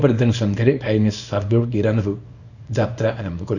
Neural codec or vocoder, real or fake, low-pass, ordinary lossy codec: codec, 24 kHz, 0.9 kbps, WavTokenizer, small release; fake; 7.2 kHz; none